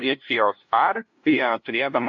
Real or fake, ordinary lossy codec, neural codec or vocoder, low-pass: fake; AAC, 48 kbps; codec, 16 kHz, 0.5 kbps, FunCodec, trained on LibriTTS, 25 frames a second; 7.2 kHz